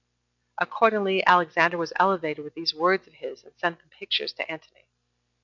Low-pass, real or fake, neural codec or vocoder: 7.2 kHz; real; none